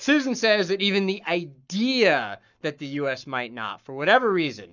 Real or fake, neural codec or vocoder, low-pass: fake; codec, 44.1 kHz, 7.8 kbps, Pupu-Codec; 7.2 kHz